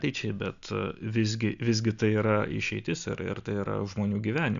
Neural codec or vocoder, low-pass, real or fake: none; 7.2 kHz; real